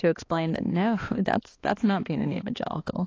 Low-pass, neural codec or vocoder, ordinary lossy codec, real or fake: 7.2 kHz; codec, 16 kHz, 2 kbps, X-Codec, HuBERT features, trained on balanced general audio; AAC, 32 kbps; fake